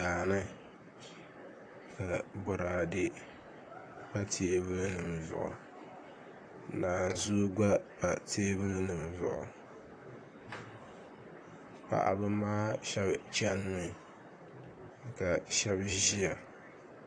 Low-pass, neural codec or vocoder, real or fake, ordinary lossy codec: 9.9 kHz; vocoder, 44.1 kHz, 128 mel bands, Pupu-Vocoder; fake; AAC, 48 kbps